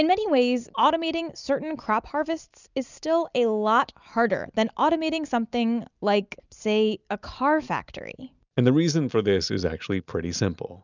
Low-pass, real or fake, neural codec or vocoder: 7.2 kHz; real; none